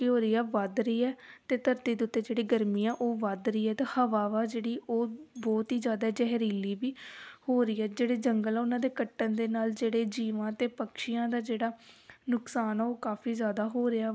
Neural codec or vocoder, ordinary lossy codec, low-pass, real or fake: none; none; none; real